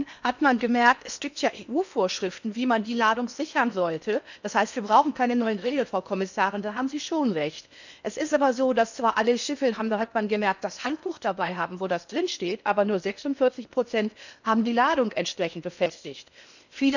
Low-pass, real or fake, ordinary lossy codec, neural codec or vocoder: 7.2 kHz; fake; none; codec, 16 kHz in and 24 kHz out, 0.8 kbps, FocalCodec, streaming, 65536 codes